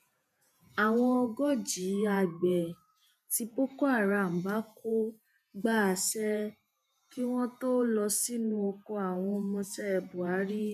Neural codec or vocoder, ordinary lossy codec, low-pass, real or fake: vocoder, 48 kHz, 128 mel bands, Vocos; none; 14.4 kHz; fake